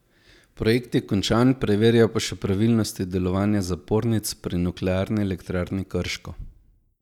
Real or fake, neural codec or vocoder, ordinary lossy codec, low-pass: real; none; none; 19.8 kHz